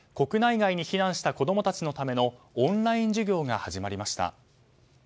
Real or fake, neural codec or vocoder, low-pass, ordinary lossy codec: real; none; none; none